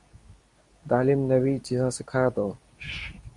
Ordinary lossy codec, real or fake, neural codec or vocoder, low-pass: Opus, 64 kbps; fake; codec, 24 kHz, 0.9 kbps, WavTokenizer, medium speech release version 2; 10.8 kHz